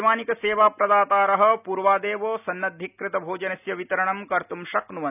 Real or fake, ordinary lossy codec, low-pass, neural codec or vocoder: real; none; 3.6 kHz; none